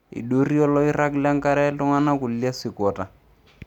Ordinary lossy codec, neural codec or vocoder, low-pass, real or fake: none; none; 19.8 kHz; real